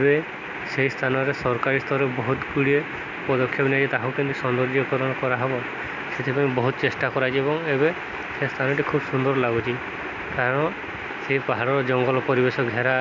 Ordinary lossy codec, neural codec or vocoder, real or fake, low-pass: none; none; real; 7.2 kHz